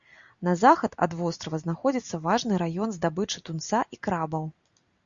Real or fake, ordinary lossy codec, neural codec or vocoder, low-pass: real; Opus, 64 kbps; none; 7.2 kHz